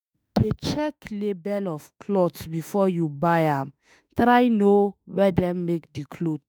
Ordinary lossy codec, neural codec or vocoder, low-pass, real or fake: none; autoencoder, 48 kHz, 32 numbers a frame, DAC-VAE, trained on Japanese speech; none; fake